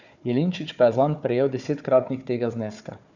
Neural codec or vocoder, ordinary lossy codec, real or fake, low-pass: codec, 16 kHz, 4 kbps, FunCodec, trained on Chinese and English, 50 frames a second; none; fake; 7.2 kHz